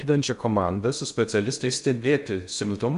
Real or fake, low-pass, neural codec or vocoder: fake; 10.8 kHz; codec, 16 kHz in and 24 kHz out, 0.6 kbps, FocalCodec, streaming, 2048 codes